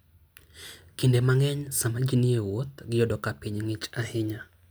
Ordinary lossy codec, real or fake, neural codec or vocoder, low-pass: none; real; none; none